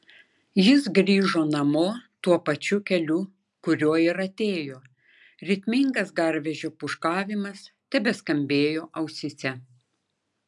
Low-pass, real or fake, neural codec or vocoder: 10.8 kHz; real; none